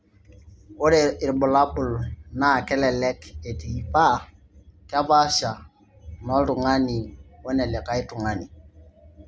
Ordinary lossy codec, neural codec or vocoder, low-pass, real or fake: none; none; none; real